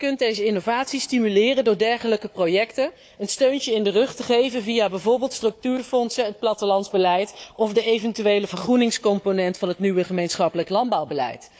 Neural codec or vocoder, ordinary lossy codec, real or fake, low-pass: codec, 16 kHz, 4 kbps, FunCodec, trained on Chinese and English, 50 frames a second; none; fake; none